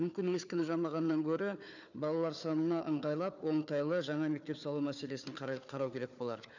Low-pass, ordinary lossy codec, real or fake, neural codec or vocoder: 7.2 kHz; none; fake; codec, 16 kHz, 4 kbps, FunCodec, trained on Chinese and English, 50 frames a second